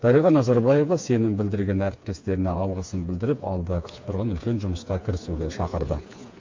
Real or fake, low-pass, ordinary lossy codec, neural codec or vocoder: fake; 7.2 kHz; MP3, 48 kbps; codec, 16 kHz, 4 kbps, FreqCodec, smaller model